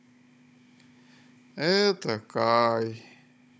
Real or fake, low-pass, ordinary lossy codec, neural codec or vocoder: fake; none; none; codec, 16 kHz, 16 kbps, FunCodec, trained on Chinese and English, 50 frames a second